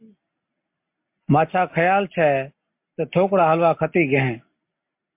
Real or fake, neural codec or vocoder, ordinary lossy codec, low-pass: real; none; MP3, 32 kbps; 3.6 kHz